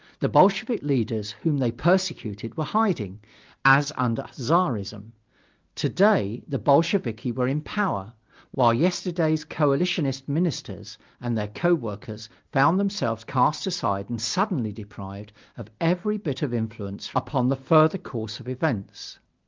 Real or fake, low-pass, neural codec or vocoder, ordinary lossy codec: real; 7.2 kHz; none; Opus, 24 kbps